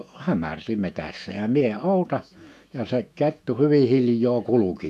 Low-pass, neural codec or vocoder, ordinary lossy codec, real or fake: 14.4 kHz; autoencoder, 48 kHz, 128 numbers a frame, DAC-VAE, trained on Japanese speech; none; fake